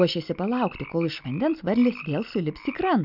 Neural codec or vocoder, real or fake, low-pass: none; real; 5.4 kHz